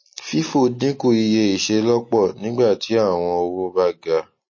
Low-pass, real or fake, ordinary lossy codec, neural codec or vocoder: 7.2 kHz; real; MP3, 32 kbps; none